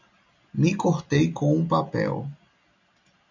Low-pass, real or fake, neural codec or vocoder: 7.2 kHz; real; none